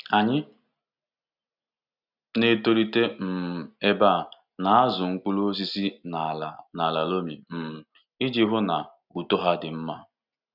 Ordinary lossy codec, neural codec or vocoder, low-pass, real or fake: none; none; 5.4 kHz; real